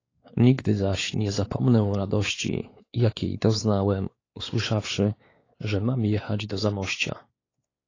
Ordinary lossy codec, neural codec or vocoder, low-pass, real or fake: AAC, 32 kbps; codec, 16 kHz, 4 kbps, X-Codec, WavLM features, trained on Multilingual LibriSpeech; 7.2 kHz; fake